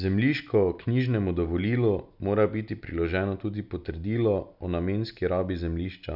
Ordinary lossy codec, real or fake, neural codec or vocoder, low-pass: none; real; none; 5.4 kHz